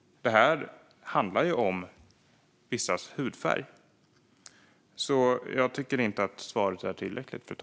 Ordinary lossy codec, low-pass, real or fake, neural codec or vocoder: none; none; real; none